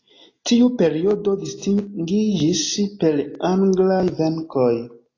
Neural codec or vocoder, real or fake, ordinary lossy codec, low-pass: none; real; AAC, 32 kbps; 7.2 kHz